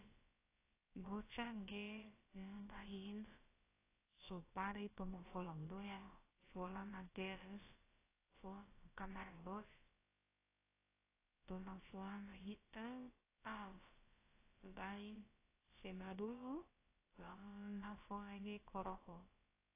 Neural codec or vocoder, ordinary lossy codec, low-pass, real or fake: codec, 16 kHz, about 1 kbps, DyCAST, with the encoder's durations; AAC, 16 kbps; 3.6 kHz; fake